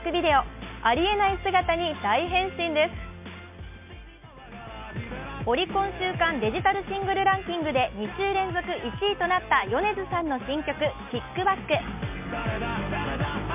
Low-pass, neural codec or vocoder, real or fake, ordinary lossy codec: 3.6 kHz; none; real; MP3, 32 kbps